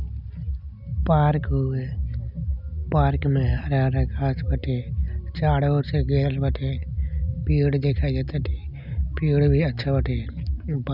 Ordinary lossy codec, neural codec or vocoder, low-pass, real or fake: none; none; 5.4 kHz; real